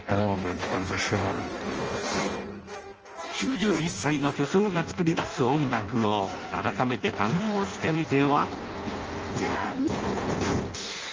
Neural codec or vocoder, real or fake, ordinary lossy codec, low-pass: codec, 16 kHz in and 24 kHz out, 0.6 kbps, FireRedTTS-2 codec; fake; Opus, 24 kbps; 7.2 kHz